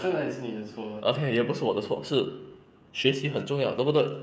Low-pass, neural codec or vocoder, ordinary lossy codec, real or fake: none; codec, 16 kHz, 16 kbps, FreqCodec, smaller model; none; fake